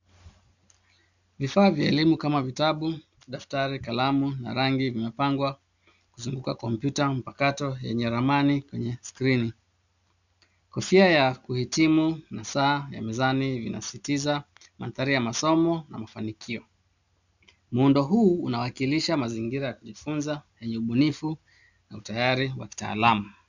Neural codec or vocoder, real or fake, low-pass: none; real; 7.2 kHz